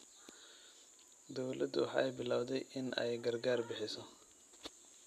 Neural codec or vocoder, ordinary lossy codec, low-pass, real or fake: none; none; 14.4 kHz; real